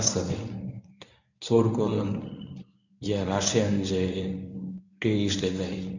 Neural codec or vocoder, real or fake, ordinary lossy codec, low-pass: codec, 24 kHz, 0.9 kbps, WavTokenizer, medium speech release version 1; fake; none; 7.2 kHz